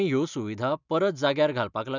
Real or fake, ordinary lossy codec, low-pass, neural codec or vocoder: real; none; 7.2 kHz; none